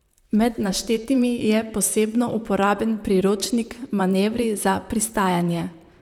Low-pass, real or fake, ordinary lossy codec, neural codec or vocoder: 19.8 kHz; fake; none; vocoder, 44.1 kHz, 128 mel bands, Pupu-Vocoder